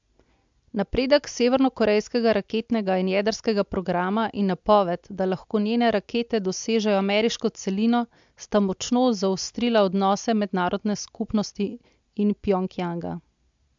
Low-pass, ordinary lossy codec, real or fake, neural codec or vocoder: 7.2 kHz; MP3, 64 kbps; real; none